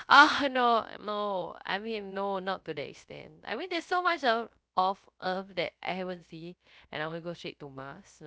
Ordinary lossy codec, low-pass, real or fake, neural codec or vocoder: none; none; fake; codec, 16 kHz, 0.3 kbps, FocalCodec